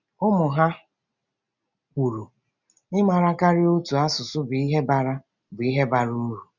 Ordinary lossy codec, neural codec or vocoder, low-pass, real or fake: none; none; 7.2 kHz; real